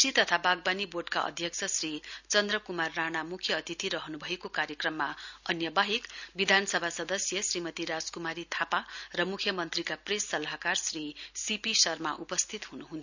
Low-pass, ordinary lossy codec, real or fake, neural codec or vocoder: 7.2 kHz; none; real; none